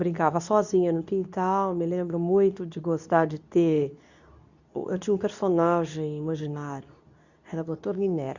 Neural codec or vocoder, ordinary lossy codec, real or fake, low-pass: codec, 24 kHz, 0.9 kbps, WavTokenizer, medium speech release version 2; none; fake; 7.2 kHz